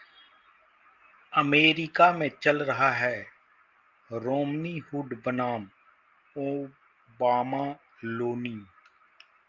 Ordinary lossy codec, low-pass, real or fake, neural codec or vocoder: Opus, 32 kbps; 7.2 kHz; real; none